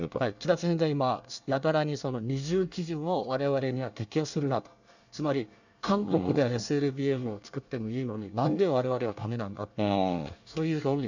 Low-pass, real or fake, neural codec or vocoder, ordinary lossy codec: 7.2 kHz; fake; codec, 24 kHz, 1 kbps, SNAC; none